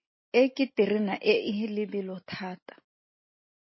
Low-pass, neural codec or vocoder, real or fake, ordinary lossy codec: 7.2 kHz; none; real; MP3, 24 kbps